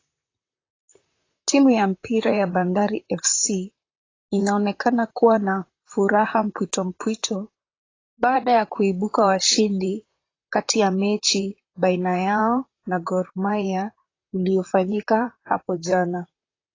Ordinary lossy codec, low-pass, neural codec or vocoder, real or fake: AAC, 32 kbps; 7.2 kHz; vocoder, 44.1 kHz, 128 mel bands, Pupu-Vocoder; fake